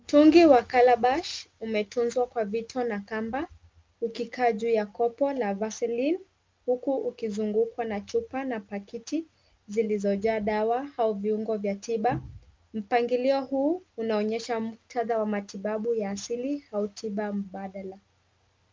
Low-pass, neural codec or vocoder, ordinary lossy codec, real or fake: 7.2 kHz; none; Opus, 24 kbps; real